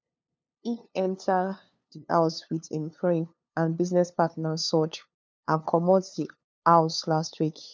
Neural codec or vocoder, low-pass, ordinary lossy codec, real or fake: codec, 16 kHz, 2 kbps, FunCodec, trained on LibriTTS, 25 frames a second; none; none; fake